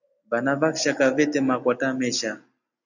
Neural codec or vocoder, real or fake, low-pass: none; real; 7.2 kHz